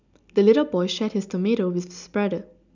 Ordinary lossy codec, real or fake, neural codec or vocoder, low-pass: none; real; none; 7.2 kHz